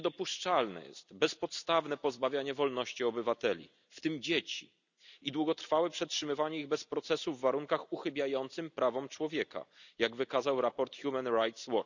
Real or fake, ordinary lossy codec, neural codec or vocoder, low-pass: real; none; none; 7.2 kHz